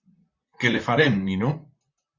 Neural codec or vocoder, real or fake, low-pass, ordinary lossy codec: vocoder, 44.1 kHz, 128 mel bands, Pupu-Vocoder; fake; 7.2 kHz; Opus, 64 kbps